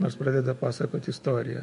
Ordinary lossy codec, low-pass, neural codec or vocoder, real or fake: MP3, 48 kbps; 14.4 kHz; autoencoder, 48 kHz, 128 numbers a frame, DAC-VAE, trained on Japanese speech; fake